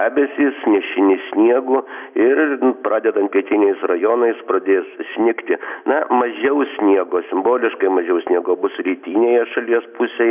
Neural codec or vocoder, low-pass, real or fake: none; 3.6 kHz; real